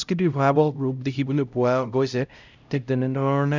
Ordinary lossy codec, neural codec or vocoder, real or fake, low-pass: none; codec, 16 kHz, 0.5 kbps, X-Codec, HuBERT features, trained on LibriSpeech; fake; 7.2 kHz